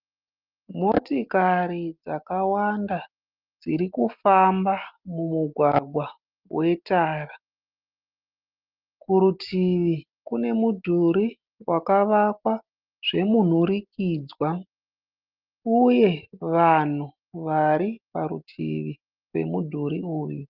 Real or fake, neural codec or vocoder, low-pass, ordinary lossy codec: real; none; 5.4 kHz; Opus, 24 kbps